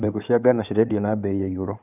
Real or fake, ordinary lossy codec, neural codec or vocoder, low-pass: fake; none; codec, 16 kHz in and 24 kHz out, 2.2 kbps, FireRedTTS-2 codec; 3.6 kHz